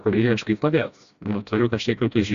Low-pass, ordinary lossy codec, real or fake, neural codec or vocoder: 7.2 kHz; Opus, 64 kbps; fake; codec, 16 kHz, 1 kbps, FreqCodec, smaller model